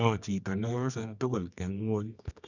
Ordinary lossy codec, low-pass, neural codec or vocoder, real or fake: none; 7.2 kHz; codec, 24 kHz, 0.9 kbps, WavTokenizer, medium music audio release; fake